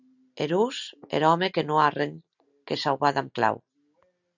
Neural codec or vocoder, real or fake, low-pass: none; real; 7.2 kHz